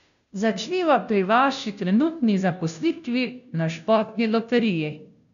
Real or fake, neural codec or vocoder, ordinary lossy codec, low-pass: fake; codec, 16 kHz, 0.5 kbps, FunCodec, trained on Chinese and English, 25 frames a second; none; 7.2 kHz